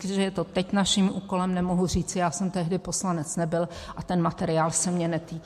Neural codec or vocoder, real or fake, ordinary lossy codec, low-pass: none; real; MP3, 64 kbps; 14.4 kHz